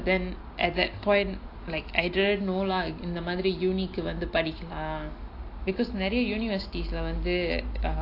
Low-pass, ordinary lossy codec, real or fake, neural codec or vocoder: 5.4 kHz; AAC, 32 kbps; real; none